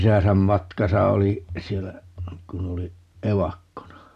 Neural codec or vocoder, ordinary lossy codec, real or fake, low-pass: none; none; real; 14.4 kHz